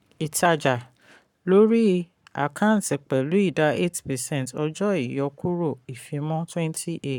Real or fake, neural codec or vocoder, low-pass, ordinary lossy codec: fake; codec, 44.1 kHz, 7.8 kbps, Pupu-Codec; 19.8 kHz; none